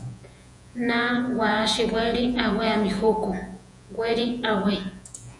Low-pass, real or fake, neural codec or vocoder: 10.8 kHz; fake; vocoder, 48 kHz, 128 mel bands, Vocos